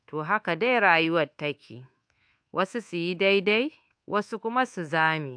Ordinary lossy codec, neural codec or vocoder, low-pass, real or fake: AAC, 64 kbps; codec, 24 kHz, 1.2 kbps, DualCodec; 9.9 kHz; fake